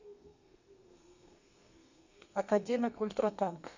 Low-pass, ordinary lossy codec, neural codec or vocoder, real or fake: 7.2 kHz; Opus, 64 kbps; codec, 32 kHz, 1.9 kbps, SNAC; fake